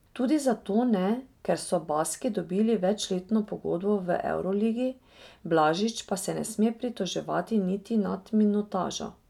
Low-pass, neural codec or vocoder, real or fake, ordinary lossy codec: 19.8 kHz; none; real; none